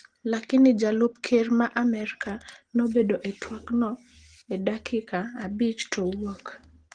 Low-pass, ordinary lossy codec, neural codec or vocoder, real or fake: 9.9 kHz; Opus, 16 kbps; none; real